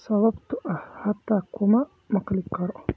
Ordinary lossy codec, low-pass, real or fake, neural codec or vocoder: none; none; real; none